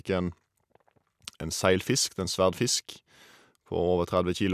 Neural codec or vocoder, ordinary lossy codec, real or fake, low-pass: none; none; real; 14.4 kHz